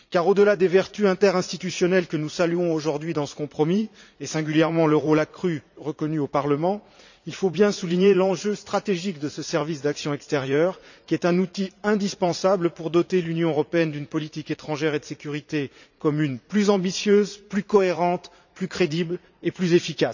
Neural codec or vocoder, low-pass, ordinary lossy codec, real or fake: vocoder, 44.1 kHz, 80 mel bands, Vocos; 7.2 kHz; none; fake